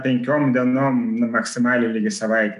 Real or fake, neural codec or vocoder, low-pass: real; none; 10.8 kHz